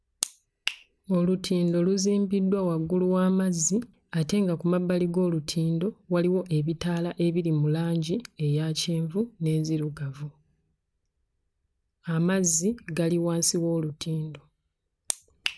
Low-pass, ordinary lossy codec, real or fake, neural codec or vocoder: none; none; real; none